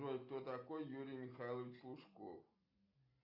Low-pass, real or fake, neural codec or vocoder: 5.4 kHz; real; none